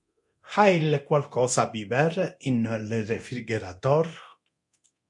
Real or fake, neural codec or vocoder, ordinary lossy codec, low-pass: fake; codec, 24 kHz, 0.9 kbps, DualCodec; MP3, 64 kbps; 10.8 kHz